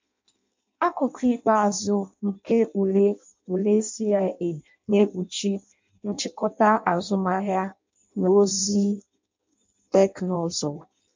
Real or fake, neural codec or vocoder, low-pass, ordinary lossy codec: fake; codec, 16 kHz in and 24 kHz out, 0.6 kbps, FireRedTTS-2 codec; 7.2 kHz; MP3, 64 kbps